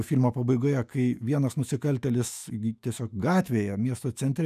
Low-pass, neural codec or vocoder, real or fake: 14.4 kHz; autoencoder, 48 kHz, 128 numbers a frame, DAC-VAE, trained on Japanese speech; fake